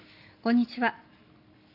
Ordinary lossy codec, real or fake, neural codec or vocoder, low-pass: none; real; none; 5.4 kHz